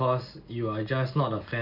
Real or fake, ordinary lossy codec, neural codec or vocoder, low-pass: real; none; none; 5.4 kHz